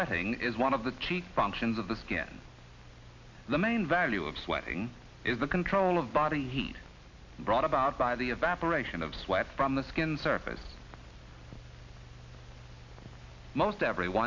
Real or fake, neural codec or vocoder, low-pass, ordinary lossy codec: real; none; 7.2 kHz; MP3, 64 kbps